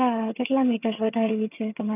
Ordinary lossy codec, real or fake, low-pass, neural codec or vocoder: none; fake; 3.6 kHz; vocoder, 22.05 kHz, 80 mel bands, HiFi-GAN